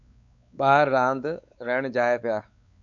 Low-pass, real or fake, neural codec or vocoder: 7.2 kHz; fake; codec, 16 kHz, 4 kbps, X-Codec, WavLM features, trained on Multilingual LibriSpeech